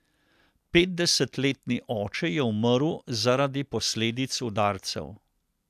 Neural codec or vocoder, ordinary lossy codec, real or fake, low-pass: none; none; real; 14.4 kHz